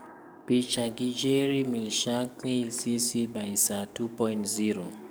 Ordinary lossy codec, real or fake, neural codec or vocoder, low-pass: none; fake; codec, 44.1 kHz, 7.8 kbps, DAC; none